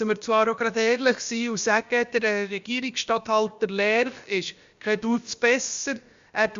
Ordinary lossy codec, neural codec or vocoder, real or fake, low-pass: Opus, 64 kbps; codec, 16 kHz, about 1 kbps, DyCAST, with the encoder's durations; fake; 7.2 kHz